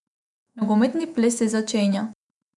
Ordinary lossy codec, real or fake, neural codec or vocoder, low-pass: none; fake; autoencoder, 48 kHz, 128 numbers a frame, DAC-VAE, trained on Japanese speech; 10.8 kHz